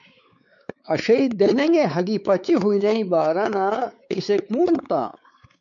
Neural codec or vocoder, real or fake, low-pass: codec, 16 kHz, 4 kbps, X-Codec, WavLM features, trained on Multilingual LibriSpeech; fake; 7.2 kHz